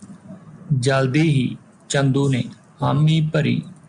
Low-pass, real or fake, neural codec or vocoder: 9.9 kHz; real; none